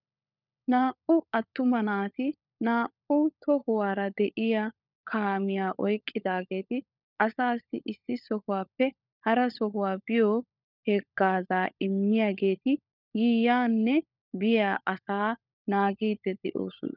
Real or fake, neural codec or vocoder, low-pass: fake; codec, 16 kHz, 16 kbps, FunCodec, trained on LibriTTS, 50 frames a second; 5.4 kHz